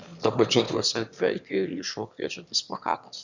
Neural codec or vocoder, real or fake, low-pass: autoencoder, 22.05 kHz, a latent of 192 numbers a frame, VITS, trained on one speaker; fake; 7.2 kHz